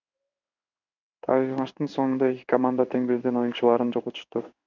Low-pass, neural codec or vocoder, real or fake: 7.2 kHz; codec, 16 kHz in and 24 kHz out, 1 kbps, XY-Tokenizer; fake